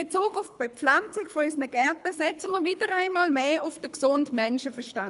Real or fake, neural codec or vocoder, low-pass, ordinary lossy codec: fake; codec, 24 kHz, 3 kbps, HILCodec; 10.8 kHz; none